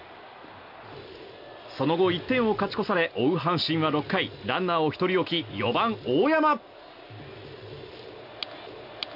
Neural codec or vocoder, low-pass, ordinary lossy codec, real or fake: none; 5.4 kHz; none; real